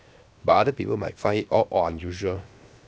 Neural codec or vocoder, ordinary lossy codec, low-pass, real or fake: codec, 16 kHz, 0.7 kbps, FocalCodec; none; none; fake